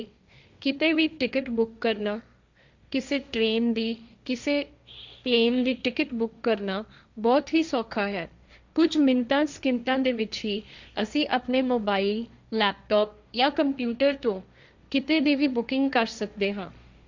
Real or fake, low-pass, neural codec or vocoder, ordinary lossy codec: fake; 7.2 kHz; codec, 16 kHz, 1.1 kbps, Voila-Tokenizer; Opus, 64 kbps